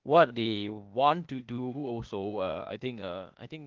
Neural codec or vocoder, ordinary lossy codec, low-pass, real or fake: codec, 16 kHz, 0.8 kbps, ZipCodec; Opus, 32 kbps; 7.2 kHz; fake